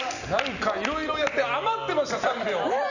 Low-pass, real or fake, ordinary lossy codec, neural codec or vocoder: 7.2 kHz; real; none; none